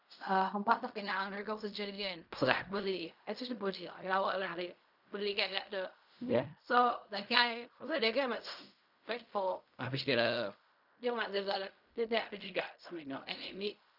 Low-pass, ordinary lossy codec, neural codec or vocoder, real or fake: 5.4 kHz; none; codec, 16 kHz in and 24 kHz out, 0.4 kbps, LongCat-Audio-Codec, fine tuned four codebook decoder; fake